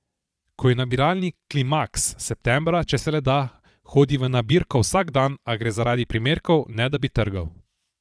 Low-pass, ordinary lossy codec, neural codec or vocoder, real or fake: none; none; vocoder, 22.05 kHz, 80 mel bands, Vocos; fake